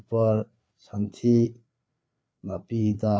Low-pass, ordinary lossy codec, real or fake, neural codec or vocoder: none; none; fake; codec, 16 kHz, 4 kbps, FreqCodec, larger model